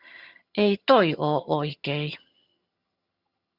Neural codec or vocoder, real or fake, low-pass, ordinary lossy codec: vocoder, 22.05 kHz, 80 mel bands, HiFi-GAN; fake; 5.4 kHz; Opus, 64 kbps